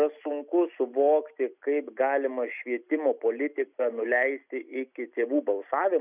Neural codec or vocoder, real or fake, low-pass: none; real; 3.6 kHz